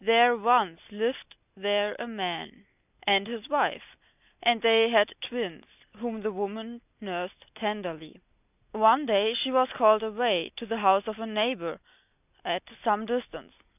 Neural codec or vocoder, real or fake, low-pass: none; real; 3.6 kHz